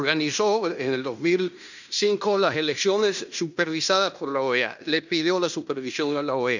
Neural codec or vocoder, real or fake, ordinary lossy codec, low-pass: codec, 16 kHz in and 24 kHz out, 0.9 kbps, LongCat-Audio-Codec, fine tuned four codebook decoder; fake; none; 7.2 kHz